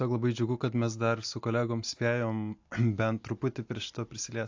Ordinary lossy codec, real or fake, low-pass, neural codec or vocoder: AAC, 48 kbps; real; 7.2 kHz; none